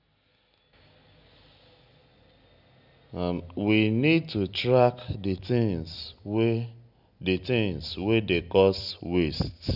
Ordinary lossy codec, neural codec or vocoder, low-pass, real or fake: none; none; 5.4 kHz; real